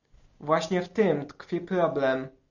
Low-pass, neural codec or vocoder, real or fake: 7.2 kHz; none; real